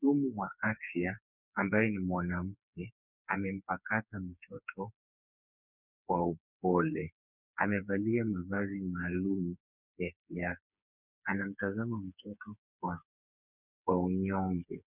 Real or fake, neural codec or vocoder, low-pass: fake; codec, 16 kHz, 4 kbps, FreqCodec, smaller model; 3.6 kHz